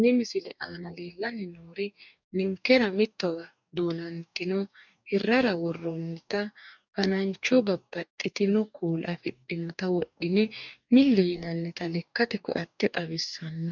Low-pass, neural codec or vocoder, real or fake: 7.2 kHz; codec, 44.1 kHz, 2.6 kbps, DAC; fake